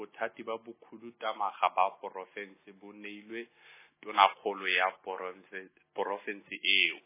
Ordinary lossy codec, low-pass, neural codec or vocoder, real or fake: MP3, 16 kbps; 3.6 kHz; none; real